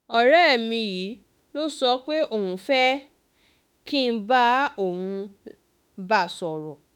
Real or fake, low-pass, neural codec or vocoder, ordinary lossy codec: fake; 19.8 kHz; autoencoder, 48 kHz, 32 numbers a frame, DAC-VAE, trained on Japanese speech; none